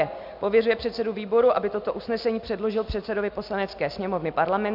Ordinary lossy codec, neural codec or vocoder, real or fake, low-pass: MP3, 48 kbps; none; real; 5.4 kHz